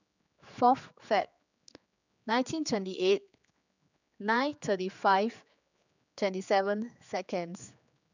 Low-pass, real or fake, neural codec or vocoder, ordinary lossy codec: 7.2 kHz; fake; codec, 16 kHz, 4 kbps, X-Codec, HuBERT features, trained on general audio; none